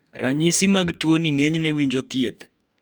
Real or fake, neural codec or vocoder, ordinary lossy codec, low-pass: fake; codec, 44.1 kHz, 2.6 kbps, DAC; none; none